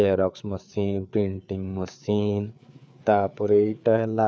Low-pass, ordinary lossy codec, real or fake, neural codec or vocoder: none; none; fake; codec, 16 kHz, 4 kbps, FreqCodec, larger model